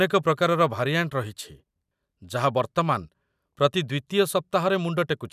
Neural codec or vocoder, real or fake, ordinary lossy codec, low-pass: none; real; none; 14.4 kHz